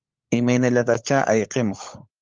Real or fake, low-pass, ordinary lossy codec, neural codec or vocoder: fake; 7.2 kHz; Opus, 24 kbps; codec, 16 kHz, 16 kbps, FunCodec, trained on LibriTTS, 50 frames a second